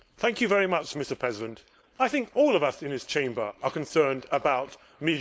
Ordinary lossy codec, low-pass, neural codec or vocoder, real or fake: none; none; codec, 16 kHz, 4.8 kbps, FACodec; fake